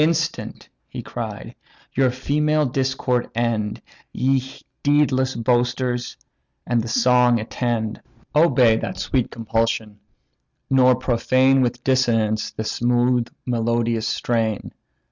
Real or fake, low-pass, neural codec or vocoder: real; 7.2 kHz; none